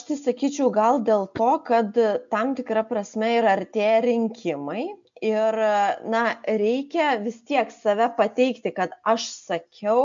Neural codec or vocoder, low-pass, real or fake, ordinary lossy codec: none; 7.2 kHz; real; MP3, 64 kbps